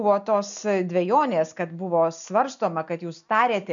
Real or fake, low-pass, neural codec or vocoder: real; 7.2 kHz; none